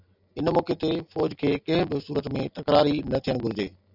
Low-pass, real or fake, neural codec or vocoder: 5.4 kHz; real; none